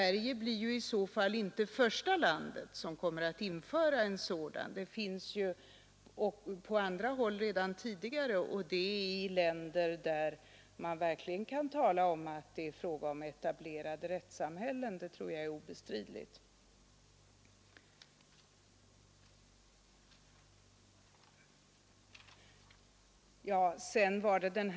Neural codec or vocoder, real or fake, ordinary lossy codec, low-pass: none; real; none; none